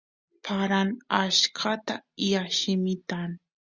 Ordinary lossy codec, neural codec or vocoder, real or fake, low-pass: Opus, 64 kbps; none; real; 7.2 kHz